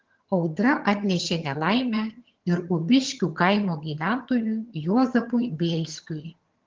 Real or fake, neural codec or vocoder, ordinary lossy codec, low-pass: fake; vocoder, 22.05 kHz, 80 mel bands, HiFi-GAN; Opus, 16 kbps; 7.2 kHz